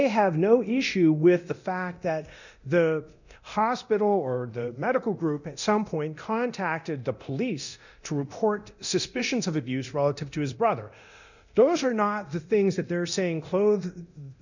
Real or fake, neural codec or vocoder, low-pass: fake; codec, 24 kHz, 0.9 kbps, DualCodec; 7.2 kHz